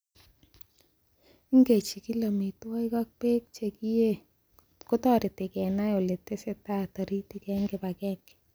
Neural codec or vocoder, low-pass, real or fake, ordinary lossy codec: none; none; real; none